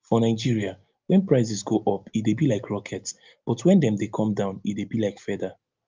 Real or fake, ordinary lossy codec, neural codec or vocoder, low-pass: real; Opus, 24 kbps; none; 7.2 kHz